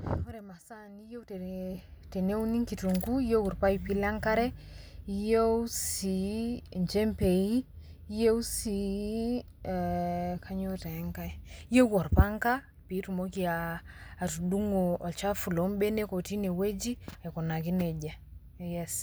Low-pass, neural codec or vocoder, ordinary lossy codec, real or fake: none; none; none; real